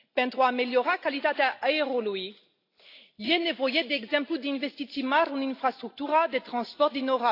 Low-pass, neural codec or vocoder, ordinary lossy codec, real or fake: 5.4 kHz; none; AAC, 32 kbps; real